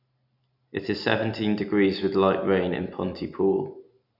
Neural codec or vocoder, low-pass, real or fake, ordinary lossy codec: none; 5.4 kHz; real; none